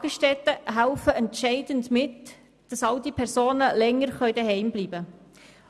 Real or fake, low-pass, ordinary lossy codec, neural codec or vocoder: real; none; none; none